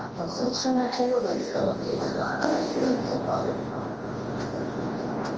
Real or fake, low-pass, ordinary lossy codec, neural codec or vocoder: fake; 7.2 kHz; Opus, 24 kbps; codec, 24 kHz, 0.9 kbps, WavTokenizer, large speech release